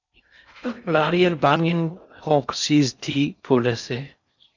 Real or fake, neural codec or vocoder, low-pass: fake; codec, 16 kHz in and 24 kHz out, 0.6 kbps, FocalCodec, streaming, 4096 codes; 7.2 kHz